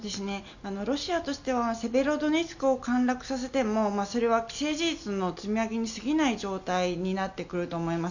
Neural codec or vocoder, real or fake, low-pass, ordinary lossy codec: none; real; 7.2 kHz; none